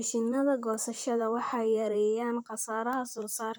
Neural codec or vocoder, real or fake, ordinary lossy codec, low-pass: vocoder, 44.1 kHz, 128 mel bands, Pupu-Vocoder; fake; none; none